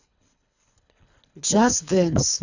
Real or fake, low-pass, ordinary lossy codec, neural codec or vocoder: fake; 7.2 kHz; none; codec, 24 kHz, 3 kbps, HILCodec